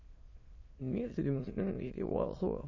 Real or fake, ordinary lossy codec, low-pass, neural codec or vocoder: fake; MP3, 32 kbps; 7.2 kHz; autoencoder, 22.05 kHz, a latent of 192 numbers a frame, VITS, trained on many speakers